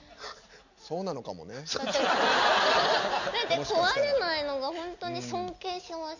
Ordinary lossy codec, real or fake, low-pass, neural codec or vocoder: none; real; 7.2 kHz; none